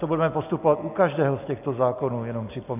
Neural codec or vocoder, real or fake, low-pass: none; real; 3.6 kHz